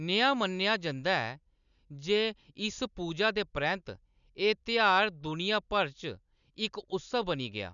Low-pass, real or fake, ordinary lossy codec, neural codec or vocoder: 7.2 kHz; real; none; none